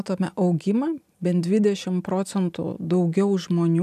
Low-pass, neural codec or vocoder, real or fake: 14.4 kHz; none; real